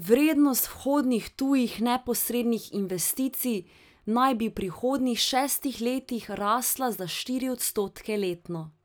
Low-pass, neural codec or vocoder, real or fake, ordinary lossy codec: none; none; real; none